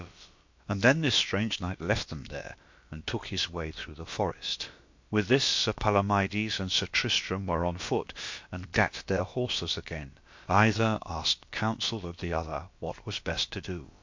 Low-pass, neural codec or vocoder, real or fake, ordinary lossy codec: 7.2 kHz; codec, 16 kHz, about 1 kbps, DyCAST, with the encoder's durations; fake; MP3, 48 kbps